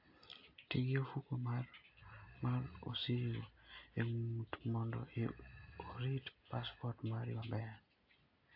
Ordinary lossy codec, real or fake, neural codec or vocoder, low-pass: AAC, 32 kbps; real; none; 5.4 kHz